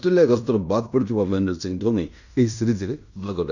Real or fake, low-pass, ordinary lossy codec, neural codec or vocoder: fake; 7.2 kHz; none; codec, 16 kHz in and 24 kHz out, 0.9 kbps, LongCat-Audio-Codec, fine tuned four codebook decoder